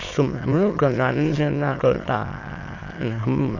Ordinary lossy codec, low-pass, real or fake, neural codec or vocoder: AAC, 48 kbps; 7.2 kHz; fake; autoencoder, 22.05 kHz, a latent of 192 numbers a frame, VITS, trained on many speakers